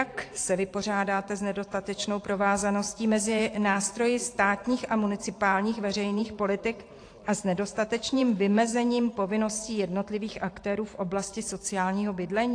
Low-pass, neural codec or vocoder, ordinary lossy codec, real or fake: 9.9 kHz; vocoder, 44.1 kHz, 128 mel bands every 512 samples, BigVGAN v2; AAC, 48 kbps; fake